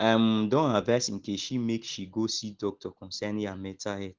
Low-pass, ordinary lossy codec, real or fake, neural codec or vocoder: 7.2 kHz; Opus, 16 kbps; real; none